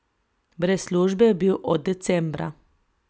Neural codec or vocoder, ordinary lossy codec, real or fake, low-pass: none; none; real; none